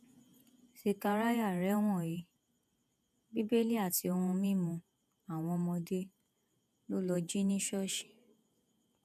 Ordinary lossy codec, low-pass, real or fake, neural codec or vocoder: none; 14.4 kHz; fake; vocoder, 48 kHz, 128 mel bands, Vocos